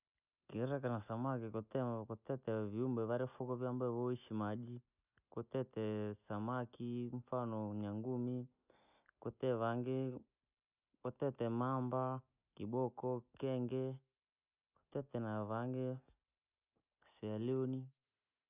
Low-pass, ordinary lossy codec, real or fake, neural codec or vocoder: 3.6 kHz; none; real; none